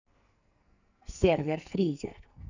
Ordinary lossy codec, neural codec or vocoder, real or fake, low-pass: none; codec, 44.1 kHz, 2.6 kbps, SNAC; fake; 7.2 kHz